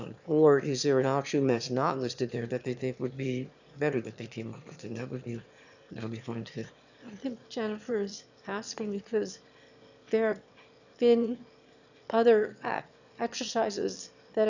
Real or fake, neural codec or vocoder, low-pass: fake; autoencoder, 22.05 kHz, a latent of 192 numbers a frame, VITS, trained on one speaker; 7.2 kHz